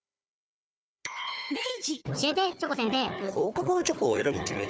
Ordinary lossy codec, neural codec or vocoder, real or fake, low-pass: none; codec, 16 kHz, 4 kbps, FunCodec, trained on Chinese and English, 50 frames a second; fake; none